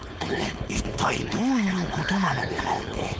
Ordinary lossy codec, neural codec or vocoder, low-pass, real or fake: none; codec, 16 kHz, 4.8 kbps, FACodec; none; fake